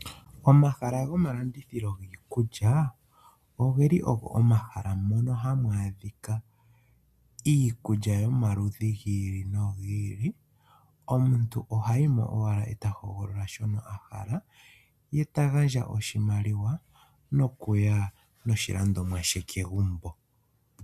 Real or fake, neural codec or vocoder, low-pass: real; none; 14.4 kHz